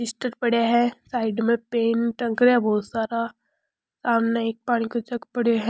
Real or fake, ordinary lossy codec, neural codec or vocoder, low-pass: real; none; none; none